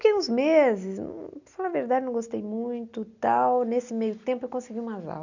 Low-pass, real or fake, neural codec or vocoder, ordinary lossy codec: 7.2 kHz; real; none; none